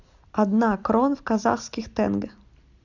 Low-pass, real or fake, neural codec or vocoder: 7.2 kHz; real; none